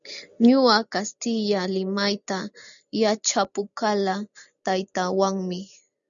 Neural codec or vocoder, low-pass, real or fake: none; 7.2 kHz; real